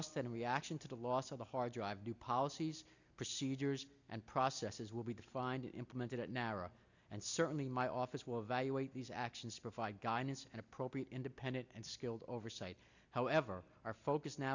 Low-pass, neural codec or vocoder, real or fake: 7.2 kHz; none; real